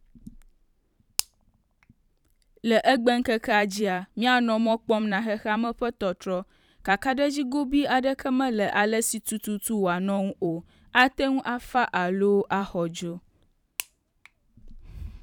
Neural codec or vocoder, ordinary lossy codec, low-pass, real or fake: vocoder, 44.1 kHz, 128 mel bands every 512 samples, BigVGAN v2; none; 19.8 kHz; fake